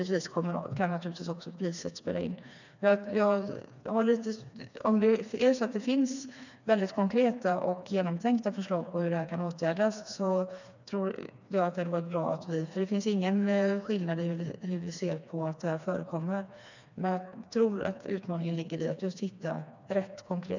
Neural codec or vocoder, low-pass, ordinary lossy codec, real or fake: codec, 16 kHz, 2 kbps, FreqCodec, smaller model; 7.2 kHz; none; fake